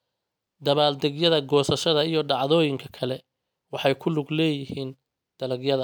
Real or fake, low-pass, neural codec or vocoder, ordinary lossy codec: real; none; none; none